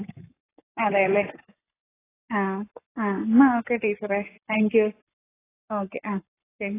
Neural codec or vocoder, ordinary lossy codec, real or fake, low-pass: codec, 44.1 kHz, 7.8 kbps, Pupu-Codec; AAC, 16 kbps; fake; 3.6 kHz